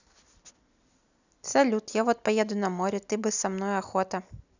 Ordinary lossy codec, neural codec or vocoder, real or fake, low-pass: none; none; real; 7.2 kHz